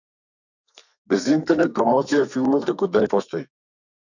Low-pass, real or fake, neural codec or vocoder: 7.2 kHz; fake; codec, 32 kHz, 1.9 kbps, SNAC